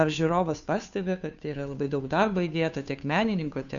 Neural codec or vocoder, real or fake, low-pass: codec, 16 kHz, 2 kbps, FunCodec, trained on Chinese and English, 25 frames a second; fake; 7.2 kHz